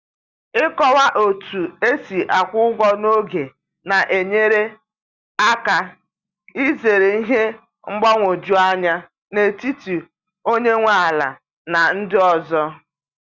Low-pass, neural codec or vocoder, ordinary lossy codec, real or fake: 7.2 kHz; none; Opus, 64 kbps; real